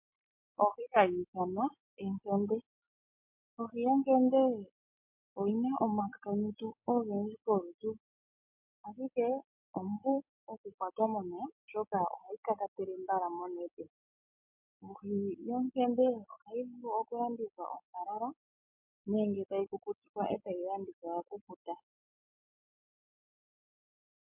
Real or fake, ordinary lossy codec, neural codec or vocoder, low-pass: real; MP3, 32 kbps; none; 3.6 kHz